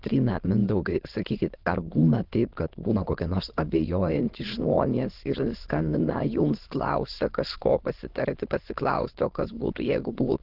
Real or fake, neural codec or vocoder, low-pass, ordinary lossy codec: fake; autoencoder, 22.05 kHz, a latent of 192 numbers a frame, VITS, trained on many speakers; 5.4 kHz; Opus, 16 kbps